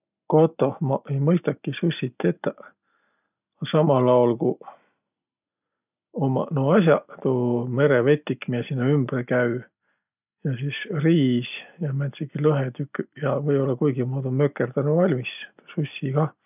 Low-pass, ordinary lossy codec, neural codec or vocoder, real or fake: 3.6 kHz; none; none; real